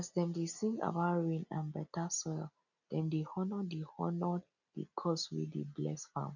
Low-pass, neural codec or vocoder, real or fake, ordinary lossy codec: 7.2 kHz; none; real; none